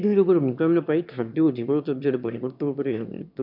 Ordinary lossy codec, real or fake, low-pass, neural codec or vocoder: none; fake; 5.4 kHz; autoencoder, 22.05 kHz, a latent of 192 numbers a frame, VITS, trained on one speaker